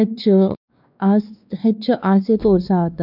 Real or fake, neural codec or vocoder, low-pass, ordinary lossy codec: fake; codec, 16 kHz, 2 kbps, X-Codec, WavLM features, trained on Multilingual LibriSpeech; 5.4 kHz; none